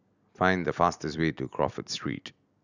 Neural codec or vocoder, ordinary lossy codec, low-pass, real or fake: none; none; 7.2 kHz; real